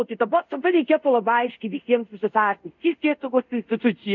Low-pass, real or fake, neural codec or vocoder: 7.2 kHz; fake; codec, 24 kHz, 0.5 kbps, DualCodec